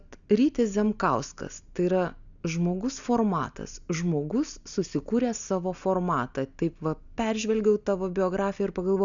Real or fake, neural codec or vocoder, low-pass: real; none; 7.2 kHz